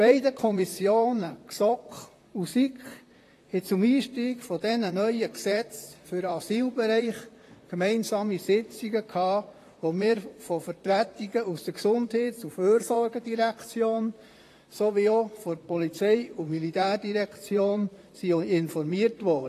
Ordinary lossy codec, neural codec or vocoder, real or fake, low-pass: AAC, 48 kbps; vocoder, 44.1 kHz, 128 mel bands, Pupu-Vocoder; fake; 14.4 kHz